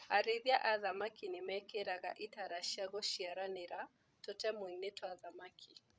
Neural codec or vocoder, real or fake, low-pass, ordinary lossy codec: codec, 16 kHz, 16 kbps, FreqCodec, larger model; fake; none; none